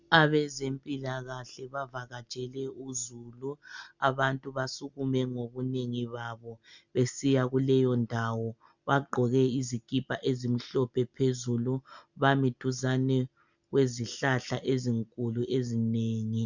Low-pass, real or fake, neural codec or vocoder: 7.2 kHz; real; none